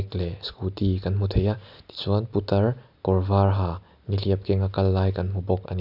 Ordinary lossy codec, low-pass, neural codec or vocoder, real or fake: none; 5.4 kHz; none; real